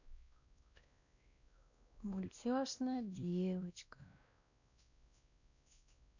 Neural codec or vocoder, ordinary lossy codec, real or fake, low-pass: codec, 16 kHz, 1 kbps, X-Codec, WavLM features, trained on Multilingual LibriSpeech; none; fake; 7.2 kHz